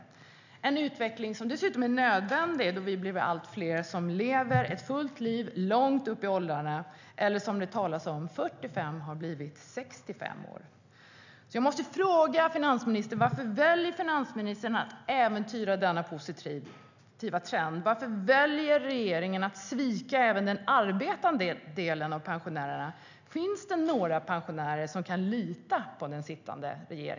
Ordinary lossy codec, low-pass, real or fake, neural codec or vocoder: none; 7.2 kHz; real; none